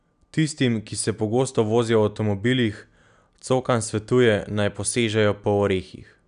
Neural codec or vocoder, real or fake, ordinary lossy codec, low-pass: none; real; none; 9.9 kHz